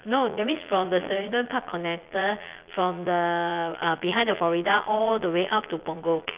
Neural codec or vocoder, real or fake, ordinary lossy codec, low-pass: vocoder, 22.05 kHz, 80 mel bands, Vocos; fake; Opus, 24 kbps; 3.6 kHz